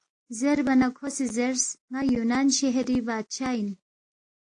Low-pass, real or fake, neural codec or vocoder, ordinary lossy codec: 9.9 kHz; real; none; AAC, 48 kbps